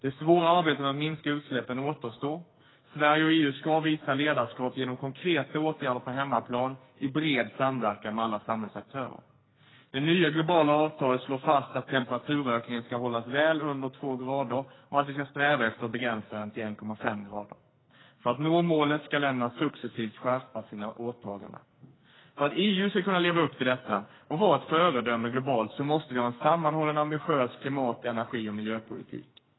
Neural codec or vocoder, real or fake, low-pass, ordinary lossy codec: codec, 44.1 kHz, 2.6 kbps, SNAC; fake; 7.2 kHz; AAC, 16 kbps